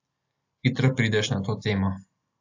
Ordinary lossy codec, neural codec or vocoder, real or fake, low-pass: AAC, 48 kbps; none; real; 7.2 kHz